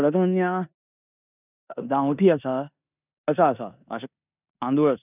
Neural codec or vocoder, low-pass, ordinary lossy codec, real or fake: codec, 16 kHz in and 24 kHz out, 0.9 kbps, LongCat-Audio-Codec, four codebook decoder; 3.6 kHz; none; fake